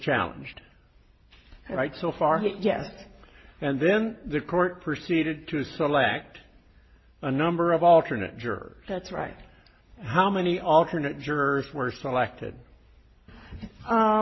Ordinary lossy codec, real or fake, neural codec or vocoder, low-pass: MP3, 24 kbps; real; none; 7.2 kHz